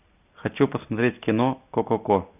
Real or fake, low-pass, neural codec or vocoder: real; 3.6 kHz; none